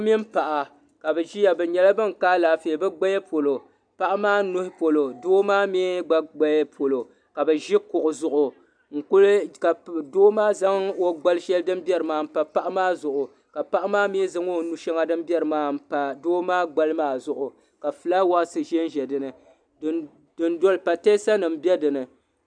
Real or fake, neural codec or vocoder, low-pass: real; none; 9.9 kHz